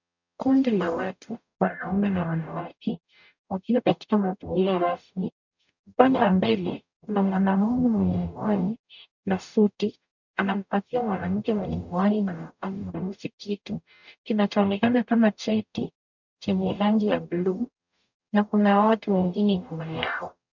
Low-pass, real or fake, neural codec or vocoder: 7.2 kHz; fake; codec, 44.1 kHz, 0.9 kbps, DAC